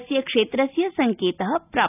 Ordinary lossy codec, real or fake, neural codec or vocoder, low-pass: none; real; none; 3.6 kHz